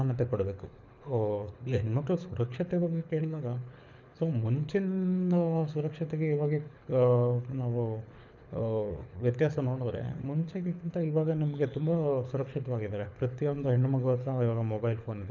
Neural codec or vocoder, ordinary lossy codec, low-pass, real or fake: codec, 24 kHz, 6 kbps, HILCodec; none; 7.2 kHz; fake